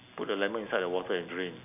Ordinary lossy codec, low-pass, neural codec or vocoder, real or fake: none; 3.6 kHz; none; real